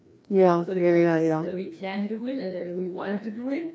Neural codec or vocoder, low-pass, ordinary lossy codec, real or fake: codec, 16 kHz, 1 kbps, FreqCodec, larger model; none; none; fake